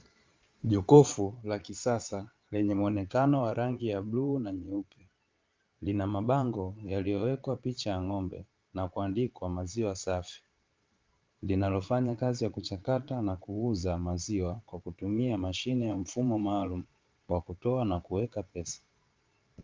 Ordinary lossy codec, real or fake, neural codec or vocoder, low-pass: Opus, 32 kbps; fake; vocoder, 22.05 kHz, 80 mel bands, Vocos; 7.2 kHz